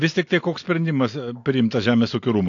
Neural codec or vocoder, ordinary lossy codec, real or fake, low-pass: none; AAC, 48 kbps; real; 7.2 kHz